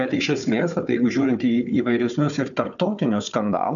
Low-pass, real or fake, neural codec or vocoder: 7.2 kHz; fake; codec, 16 kHz, 4 kbps, FunCodec, trained on Chinese and English, 50 frames a second